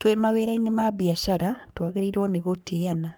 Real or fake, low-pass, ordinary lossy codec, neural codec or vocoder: fake; none; none; codec, 44.1 kHz, 3.4 kbps, Pupu-Codec